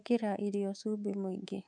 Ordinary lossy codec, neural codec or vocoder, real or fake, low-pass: none; codec, 24 kHz, 3.1 kbps, DualCodec; fake; 9.9 kHz